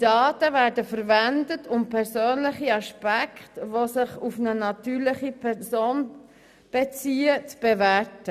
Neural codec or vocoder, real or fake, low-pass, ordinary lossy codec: none; real; 14.4 kHz; none